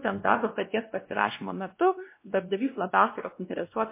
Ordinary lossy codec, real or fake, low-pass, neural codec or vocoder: MP3, 24 kbps; fake; 3.6 kHz; codec, 16 kHz, 1 kbps, X-Codec, WavLM features, trained on Multilingual LibriSpeech